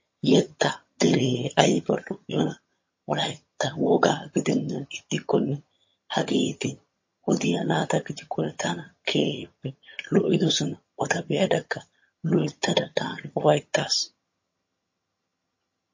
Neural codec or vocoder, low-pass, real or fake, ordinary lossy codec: vocoder, 22.05 kHz, 80 mel bands, HiFi-GAN; 7.2 kHz; fake; MP3, 32 kbps